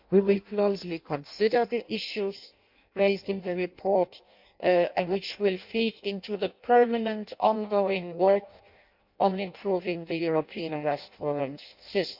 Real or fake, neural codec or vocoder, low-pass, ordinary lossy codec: fake; codec, 16 kHz in and 24 kHz out, 0.6 kbps, FireRedTTS-2 codec; 5.4 kHz; MP3, 48 kbps